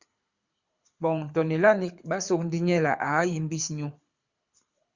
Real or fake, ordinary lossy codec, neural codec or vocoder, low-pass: fake; Opus, 64 kbps; codec, 24 kHz, 6 kbps, HILCodec; 7.2 kHz